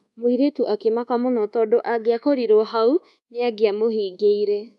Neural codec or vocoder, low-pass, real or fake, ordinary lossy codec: codec, 24 kHz, 1.2 kbps, DualCodec; none; fake; none